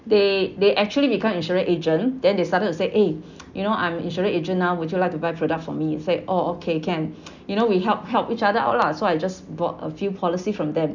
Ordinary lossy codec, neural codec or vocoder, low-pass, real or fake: none; none; 7.2 kHz; real